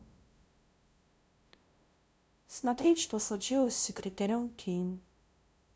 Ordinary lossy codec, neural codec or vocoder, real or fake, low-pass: none; codec, 16 kHz, 0.5 kbps, FunCodec, trained on LibriTTS, 25 frames a second; fake; none